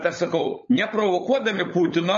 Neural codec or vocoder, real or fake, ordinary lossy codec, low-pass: codec, 16 kHz, 16 kbps, FunCodec, trained on LibriTTS, 50 frames a second; fake; MP3, 32 kbps; 7.2 kHz